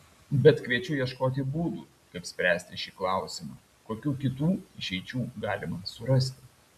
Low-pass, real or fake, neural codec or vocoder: 14.4 kHz; real; none